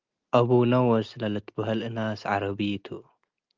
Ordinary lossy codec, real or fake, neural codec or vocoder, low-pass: Opus, 32 kbps; real; none; 7.2 kHz